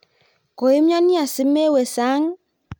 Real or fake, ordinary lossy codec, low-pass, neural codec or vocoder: real; none; none; none